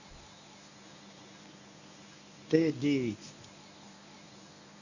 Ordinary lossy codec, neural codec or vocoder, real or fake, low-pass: none; codec, 24 kHz, 0.9 kbps, WavTokenizer, medium speech release version 1; fake; 7.2 kHz